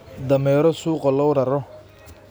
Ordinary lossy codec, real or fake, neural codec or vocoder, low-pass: none; real; none; none